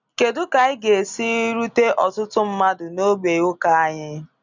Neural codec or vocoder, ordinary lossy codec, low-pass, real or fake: none; none; 7.2 kHz; real